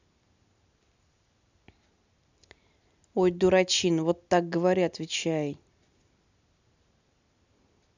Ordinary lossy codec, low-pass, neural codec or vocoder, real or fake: none; 7.2 kHz; none; real